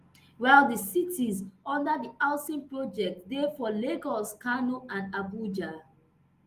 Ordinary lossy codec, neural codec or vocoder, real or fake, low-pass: Opus, 24 kbps; none; real; 14.4 kHz